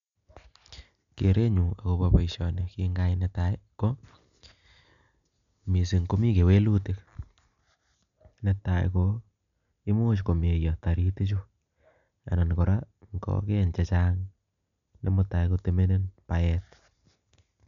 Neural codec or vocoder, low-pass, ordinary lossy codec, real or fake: none; 7.2 kHz; none; real